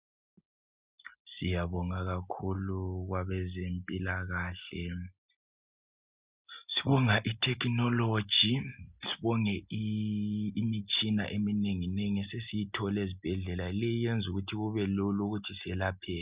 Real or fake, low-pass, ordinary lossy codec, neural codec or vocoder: real; 3.6 kHz; Opus, 64 kbps; none